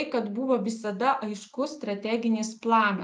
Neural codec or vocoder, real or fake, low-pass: none; real; 9.9 kHz